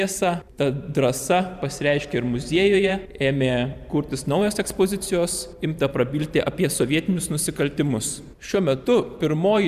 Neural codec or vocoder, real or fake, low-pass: vocoder, 44.1 kHz, 128 mel bands every 512 samples, BigVGAN v2; fake; 14.4 kHz